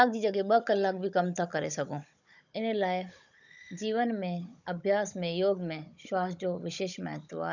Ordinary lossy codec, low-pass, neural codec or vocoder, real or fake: none; 7.2 kHz; codec, 16 kHz, 16 kbps, FunCodec, trained on Chinese and English, 50 frames a second; fake